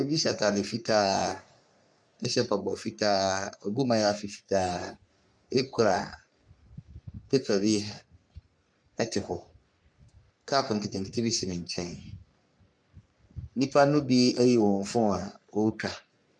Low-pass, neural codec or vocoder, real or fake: 9.9 kHz; codec, 44.1 kHz, 3.4 kbps, Pupu-Codec; fake